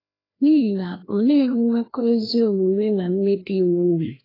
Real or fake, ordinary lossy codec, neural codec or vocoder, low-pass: fake; AAC, 24 kbps; codec, 16 kHz, 1 kbps, FreqCodec, larger model; 5.4 kHz